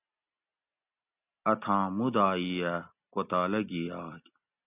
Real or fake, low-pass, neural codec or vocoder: real; 3.6 kHz; none